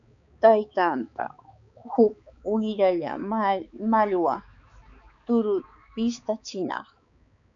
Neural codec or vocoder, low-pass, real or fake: codec, 16 kHz, 4 kbps, X-Codec, HuBERT features, trained on balanced general audio; 7.2 kHz; fake